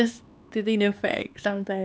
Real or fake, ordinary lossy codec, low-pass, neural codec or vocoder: fake; none; none; codec, 16 kHz, 4 kbps, X-Codec, HuBERT features, trained on LibriSpeech